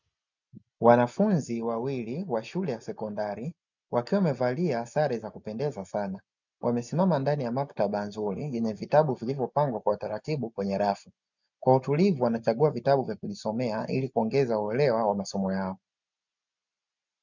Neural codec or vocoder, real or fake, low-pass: none; real; 7.2 kHz